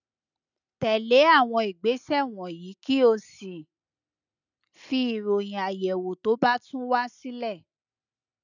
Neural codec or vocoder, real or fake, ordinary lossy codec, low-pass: none; real; none; 7.2 kHz